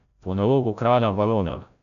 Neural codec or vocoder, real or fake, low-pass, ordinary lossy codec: codec, 16 kHz, 0.5 kbps, FreqCodec, larger model; fake; 7.2 kHz; none